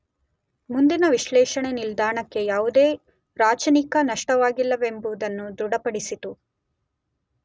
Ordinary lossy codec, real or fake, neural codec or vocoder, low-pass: none; real; none; none